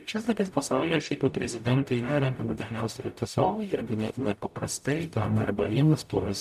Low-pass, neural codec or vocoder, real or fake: 14.4 kHz; codec, 44.1 kHz, 0.9 kbps, DAC; fake